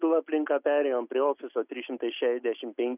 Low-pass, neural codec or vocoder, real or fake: 3.6 kHz; none; real